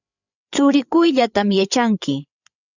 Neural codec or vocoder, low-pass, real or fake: codec, 16 kHz, 8 kbps, FreqCodec, larger model; 7.2 kHz; fake